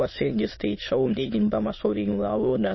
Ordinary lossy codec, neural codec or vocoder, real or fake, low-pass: MP3, 24 kbps; autoencoder, 22.05 kHz, a latent of 192 numbers a frame, VITS, trained on many speakers; fake; 7.2 kHz